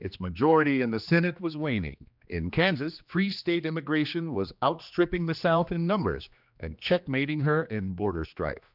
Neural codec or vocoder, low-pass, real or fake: codec, 16 kHz, 2 kbps, X-Codec, HuBERT features, trained on general audio; 5.4 kHz; fake